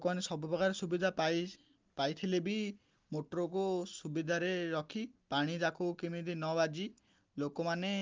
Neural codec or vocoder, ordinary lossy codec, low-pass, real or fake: none; Opus, 32 kbps; 7.2 kHz; real